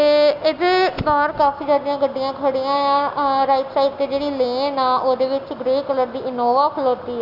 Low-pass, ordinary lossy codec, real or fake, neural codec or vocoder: 5.4 kHz; none; real; none